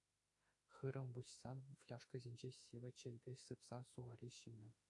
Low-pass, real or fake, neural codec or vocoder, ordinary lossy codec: 10.8 kHz; fake; autoencoder, 48 kHz, 32 numbers a frame, DAC-VAE, trained on Japanese speech; AAC, 48 kbps